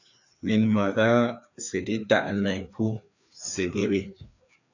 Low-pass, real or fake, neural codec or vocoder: 7.2 kHz; fake; codec, 16 kHz, 2 kbps, FreqCodec, larger model